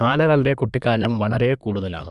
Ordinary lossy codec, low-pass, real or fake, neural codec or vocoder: MP3, 96 kbps; 10.8 kHz; fake; codec, 24 kHz, 1 kbps, SNAC